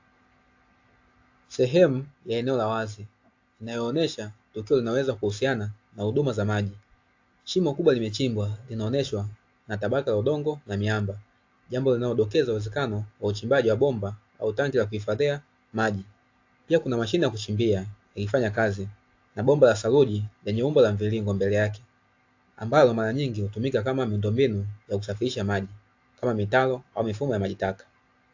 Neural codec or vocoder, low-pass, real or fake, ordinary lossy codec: none; 7.2 kHz; real; AAC, 48 kbps